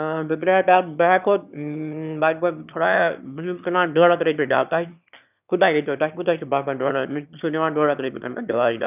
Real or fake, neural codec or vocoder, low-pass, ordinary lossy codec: fake; autoencoder, 22.05 kHz, a latent of 192 numbers a frame, VITS, trained on one speaker; 3.6 kHz; none